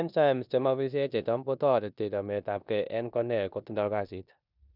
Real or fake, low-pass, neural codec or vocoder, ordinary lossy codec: fake; 5.4 kHz; codec, 16 kHz in and 24 kHz out, 0.9 kbps, LongCat-Audio-Codec, four codebook decoder; none